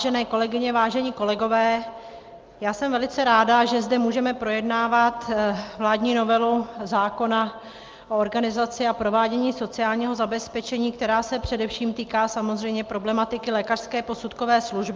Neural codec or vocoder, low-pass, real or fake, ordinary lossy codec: none; 7.2 kHz; real; Opus, 24 kbps